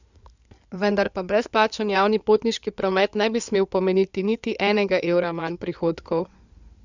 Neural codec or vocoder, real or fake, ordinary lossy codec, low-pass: codec, 16 kHz in and 24 kHz out, 2.2 kbps, FireRedTTS-2 codec; fake; none; 7.2 kHz